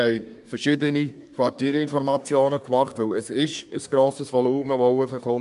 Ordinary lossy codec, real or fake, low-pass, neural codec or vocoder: none; fake; 10.8 kHz; codec, 24 kHz, 1 kbps, SNAC